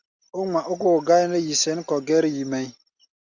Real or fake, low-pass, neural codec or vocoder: real; 7.2 kHz; none